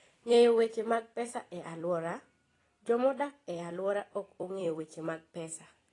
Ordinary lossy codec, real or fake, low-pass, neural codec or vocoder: AAC, 32 kbps; fake; 10.8 kHz; vocoder, 44.1 kHz, 128 mel bands every 256 samples, BigVGAN v2